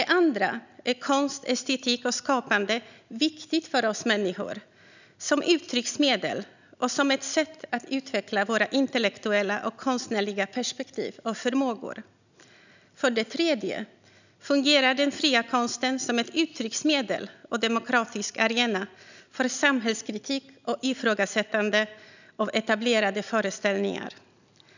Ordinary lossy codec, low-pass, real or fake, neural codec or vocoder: none; 7.2 kHz; real; none